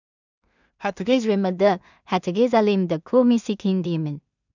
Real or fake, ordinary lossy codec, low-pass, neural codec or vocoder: fake; none; 7.2 kHz; codec, 16 kHz in and 24 kHz out, 0.4 kbps, LongCat-Audio-Codec, two codebook decoder